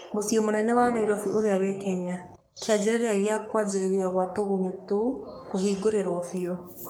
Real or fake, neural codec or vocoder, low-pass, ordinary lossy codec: fake; codec, 44.1 kHz, 3.4 kbps, Pupu-Codec; none; none